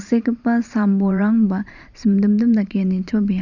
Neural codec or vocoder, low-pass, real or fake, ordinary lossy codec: vocoder, 44.1 kHz, 128 mel bands every 512 samples, BigVGAN v2; 7.2 kHz; fake; none